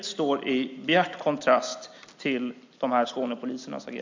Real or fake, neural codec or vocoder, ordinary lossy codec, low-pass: real; none; MP3, 64 kbps; 7.2 kHz